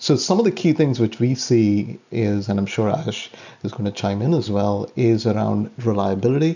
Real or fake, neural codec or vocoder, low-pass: real; none; 7.2 kHz